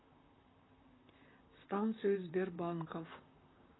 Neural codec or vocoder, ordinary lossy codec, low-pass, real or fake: vocoder, 44.1 kHz, 128 mel bands, Pupu-Vocoder; AAC, 16 kbps; 7.2 kHz; fake